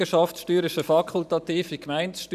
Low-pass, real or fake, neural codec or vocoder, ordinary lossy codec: 14.4 kHz; fake; vocoder, 44.1 kHz, 128 mel bands every 512 samples, BigVGAN v2; none